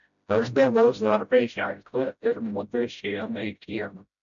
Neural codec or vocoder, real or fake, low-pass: codec, 16 kHz, 0.5 kbps, FreqCodec, smaller model; fake; 7.2 kHz